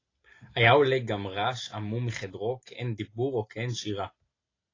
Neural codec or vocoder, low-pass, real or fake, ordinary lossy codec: none; 7.2 kHz; real; AAC, 32 kbps